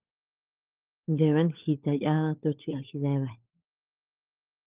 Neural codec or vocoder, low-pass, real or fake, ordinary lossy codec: codec, 16 kHz, 16 kbps, FunCodec, trained on LibriTTS, 50 frames a second; 3.6 kHz; fake; Opus, 24 kbps